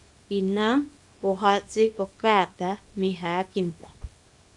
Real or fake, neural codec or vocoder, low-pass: fake; codec, 24 kHz, 0.9 kbps, WavTokenizer, small release; 10.8 kHz